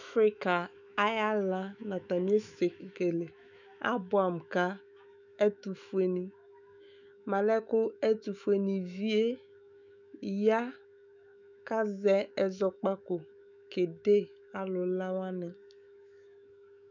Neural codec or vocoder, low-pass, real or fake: autoencoder, 48 kHz, 128 numbers a frame, DAC-VAE, trained on Japanese speech; 7.2 kHz; fake